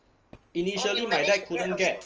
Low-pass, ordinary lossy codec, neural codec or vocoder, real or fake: 7.2 kHz; Opus, 24 kbps; none; real